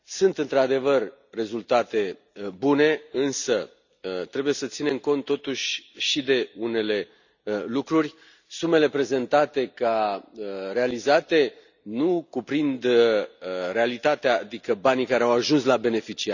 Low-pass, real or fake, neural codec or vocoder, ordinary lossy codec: 7.2 kHz; real; none; none